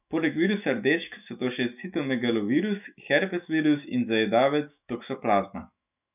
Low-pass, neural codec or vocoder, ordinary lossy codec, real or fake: 3.6 kHz; autoencoder, 48 kHz, 128 numbers a frame, DAC-VAE, trained on Japanese speech; none; fake